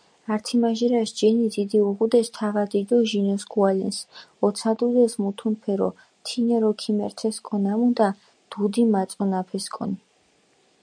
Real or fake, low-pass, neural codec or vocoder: real; 9.9 kHz; none